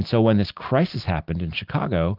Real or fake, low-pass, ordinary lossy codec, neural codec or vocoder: real; 5.4 kHz; Opus, 24 kbps; none